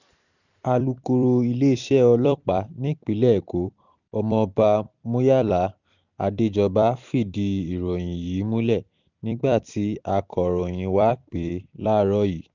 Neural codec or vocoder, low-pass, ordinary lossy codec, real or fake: vocoder, 44.1 kHz, 128 mel bands every 256 samples, BigVGAN v2; 7.2 kHz; none; fake